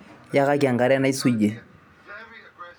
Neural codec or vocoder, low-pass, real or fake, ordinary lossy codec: none; none; real; none